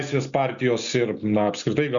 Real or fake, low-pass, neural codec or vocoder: real; 7.2 kHz; none